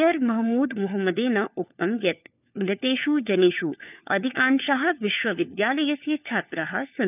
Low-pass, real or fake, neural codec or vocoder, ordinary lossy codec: 3.6 kHz; fake; codec, 16 kHz, 4 kbps, FunCodec, trained on LibriTTS, 50 frames a second; none